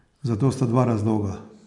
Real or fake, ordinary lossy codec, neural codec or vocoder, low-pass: real; none; none; 10.8 kHz